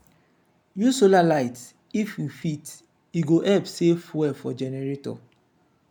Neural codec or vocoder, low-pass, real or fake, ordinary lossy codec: none; none; real; none